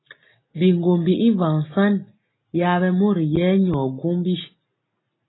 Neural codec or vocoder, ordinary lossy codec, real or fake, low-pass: none; AAC, 16 kbps; real; 7.2 kHz